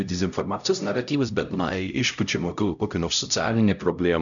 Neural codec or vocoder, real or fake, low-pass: codec, 16 kHz, 0.5 kbps, X-Codec, HuBERT features, trained on LibriSpeech; fake; 7.2 kHz